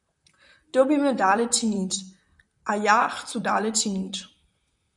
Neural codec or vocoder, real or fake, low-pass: vocoder, 44.1 kHz, 128 mel bands, Pupu-Vocoder; fake; 10.8 kHz